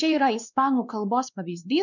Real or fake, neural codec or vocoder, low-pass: fake; codec, 16 kHz, 2 kbps, X-Codec, WavLM features, trained on Multilingual LibriSpeech; 7.2 kHz